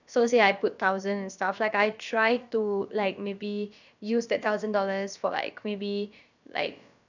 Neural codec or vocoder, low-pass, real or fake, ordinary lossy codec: codec, 16 kHz, about 1 kbps, DyCAST, with the encoder's durations; 7.2 kHz; fake; none